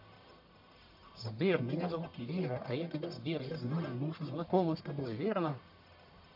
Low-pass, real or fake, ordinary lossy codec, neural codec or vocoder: 5.4 kHz; fake; MP3, 48 kbps; codec, 44.1 kHz, 1.7 kbps, Pupu-Codec